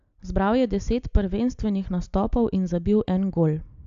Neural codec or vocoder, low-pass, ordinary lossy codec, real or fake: none; 7.2 kHz; AAC, 64 kbps; real